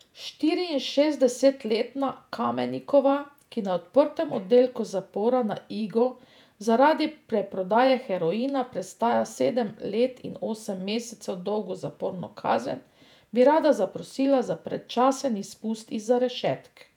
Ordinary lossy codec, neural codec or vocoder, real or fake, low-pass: none; vocoder, 48 kHz, 128 mel bands, Vocos; fake; 19.8 kHz